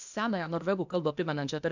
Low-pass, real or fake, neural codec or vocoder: 7.2 kHz; fake; codec, 16 kHz, 0.8 kbps, ZipCodec